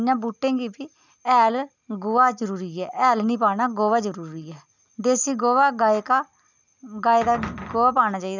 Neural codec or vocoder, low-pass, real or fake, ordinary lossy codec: none; 7.2 kHz; real; none